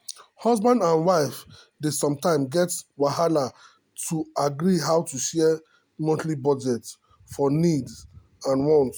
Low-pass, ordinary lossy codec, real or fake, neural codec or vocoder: none; none; real; none